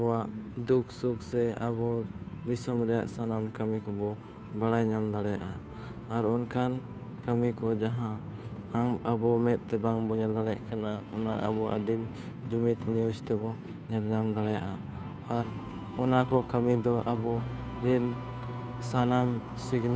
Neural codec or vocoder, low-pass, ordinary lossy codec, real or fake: codec, 16 kHz, 2 kbps, FunCodec, trained on Chinese and English, 25 frames a second; none; none; fake